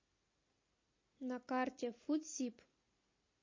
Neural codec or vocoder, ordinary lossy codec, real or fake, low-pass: none; MP3, 32 kbps; real; 7.2 kHz